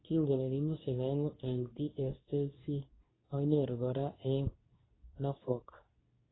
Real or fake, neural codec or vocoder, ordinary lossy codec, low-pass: fake; codec, 24 kHz, 0.9 kbps, WavTokenizer, medium speech release version 2; AAC, 16 kbps; 7.2 kHz